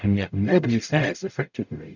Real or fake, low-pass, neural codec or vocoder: fake; 7.2 kHz; codec, 44.1 kHz, 0.9 kbps, DAC